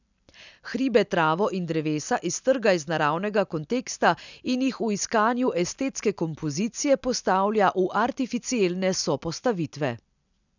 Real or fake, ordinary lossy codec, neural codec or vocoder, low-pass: real; none; none; 7.2 kHz